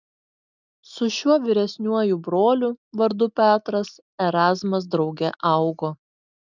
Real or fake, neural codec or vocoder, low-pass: real; none; 7.2 kHz